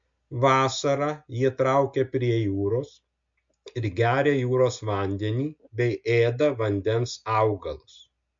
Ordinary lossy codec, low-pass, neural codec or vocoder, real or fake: MP3, 48 kbps; 7.2 kHz; none; real